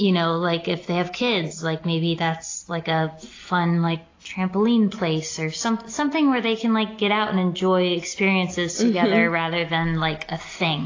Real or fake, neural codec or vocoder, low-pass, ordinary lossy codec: real; none; 7.2 kHz; AAC, 32 kbps